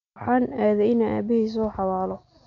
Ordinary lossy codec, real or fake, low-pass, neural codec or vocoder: none; real; 7.2 kHz; none